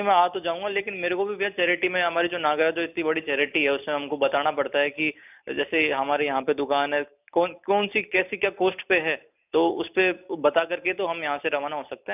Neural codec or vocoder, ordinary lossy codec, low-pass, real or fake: none; none; 3.6 kHz; real